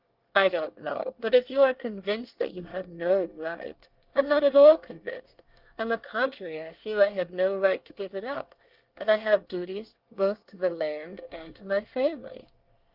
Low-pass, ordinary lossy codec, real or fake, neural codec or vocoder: 5.4 kHz; Opus, 32 kbps; fake; codec, 24 kHz, 1 kbps, SNAC